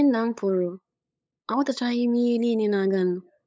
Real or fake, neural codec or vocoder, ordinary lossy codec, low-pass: fake; codec, 16 kHz, 8 kbps, FunCodec, trained on LibriTTS, 25 frames a second; none; none